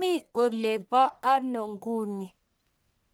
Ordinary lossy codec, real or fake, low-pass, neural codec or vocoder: none; fake; none; codec, 44.1 kHz, 1.7 kbps, Pupu-Codec